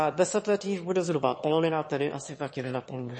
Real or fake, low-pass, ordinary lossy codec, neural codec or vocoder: fake; 9.9 kHz; MP3, 32 kbps; autoencoder, 22.05 kHz, a latent of 192 numbers a frame, VITS, trained on one speaker